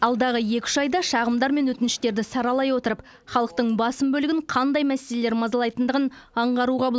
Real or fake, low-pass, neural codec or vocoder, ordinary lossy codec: real; none; none; none